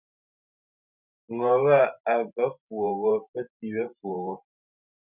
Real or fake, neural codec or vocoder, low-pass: fake; vocoder, 44.1 kHz, 128 mel bands every 512 samples, BigVGAN v2; 3.6 kHz